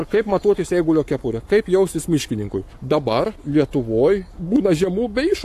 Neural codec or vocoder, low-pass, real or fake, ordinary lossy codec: codec, 44.1 kHz, 7.8 kbps, Pupu-Codec; 14.4 kHz; fake; AAC, 64 kbps